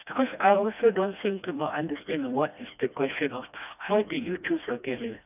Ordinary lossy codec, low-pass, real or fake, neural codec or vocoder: none; 3.6 kHz; fake; codec, 16 kHz, 1 kbps, FreqCodec, smaller model